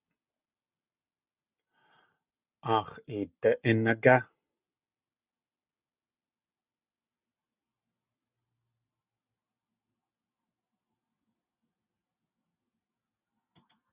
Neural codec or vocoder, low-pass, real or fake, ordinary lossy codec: none; 3.6 kHz; real; Opus, 64 kbps